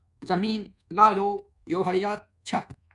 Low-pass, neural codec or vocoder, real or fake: 10.8 kHz; codec, 24 kHz, 1.2 kbps, DualCodec; fake